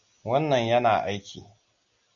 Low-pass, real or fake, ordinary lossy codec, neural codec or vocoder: 7.2 kHz; real; MP3, 96 kbps; none